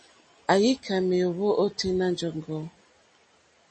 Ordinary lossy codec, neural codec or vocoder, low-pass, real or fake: MP3, 32 kbps; none; 10.8 kHz; real